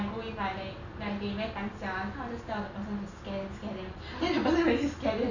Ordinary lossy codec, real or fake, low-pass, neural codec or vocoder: none; real; 7.2 kHz; none